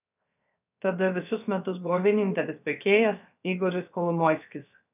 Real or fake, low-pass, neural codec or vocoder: fake; 3.6 kHz; codec, 16 kHz, 0.7 kbps, FocalCodec